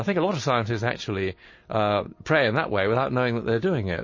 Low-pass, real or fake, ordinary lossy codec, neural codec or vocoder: 7.2 kHz; real; MP3, 32 kbps; none